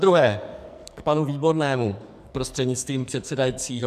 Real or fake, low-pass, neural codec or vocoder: fake; 14.4 kHz; codec, 44.1 kHz, 2.6 kbps, SNAC